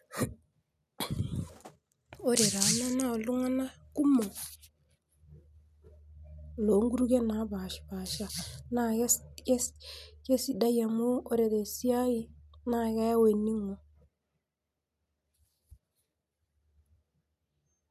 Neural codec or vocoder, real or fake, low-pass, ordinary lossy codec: none; real; 14.4 kHz; none